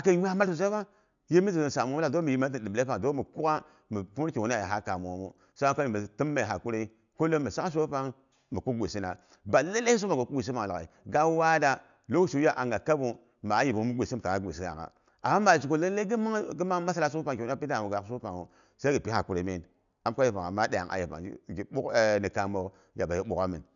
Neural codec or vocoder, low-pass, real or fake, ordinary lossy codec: none; 7.2 kHz; real; none